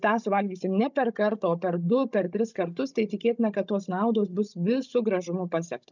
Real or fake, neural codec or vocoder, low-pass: fake; codec, 16 kHz, 16 kbps, FunCodec, trained on Chinese and English, 50 frames a second; 7.2 kHz